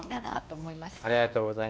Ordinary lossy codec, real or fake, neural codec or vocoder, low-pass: none; fake; codec, 16 kHz, 2 kbps, X-Codec, WavLM features, trained on Multilingual LibriSpeech; none